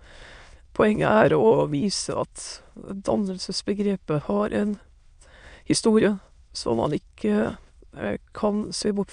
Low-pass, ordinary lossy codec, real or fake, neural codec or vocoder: 9.9 kHz; none; fake; autoencoder, 22.05 kHz, a latent of 192 numbers a frame, VITS, trained on many speakers